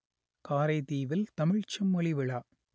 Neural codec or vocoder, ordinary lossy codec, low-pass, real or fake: none; none; none; real